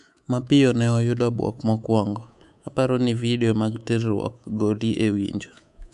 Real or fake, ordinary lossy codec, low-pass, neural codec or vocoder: fake; MP3, 96 kbps; 10.8 kHz; codec, 24 kHz, 3.1 kbps, DualCodec